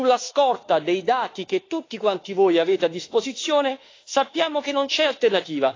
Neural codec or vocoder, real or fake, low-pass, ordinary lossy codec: autoencoder, 48 kHz, 32 numbers a frame, DAC-VAE, trained on Japanese speech; fake; 7.2 kHz; AAC, 32 kbps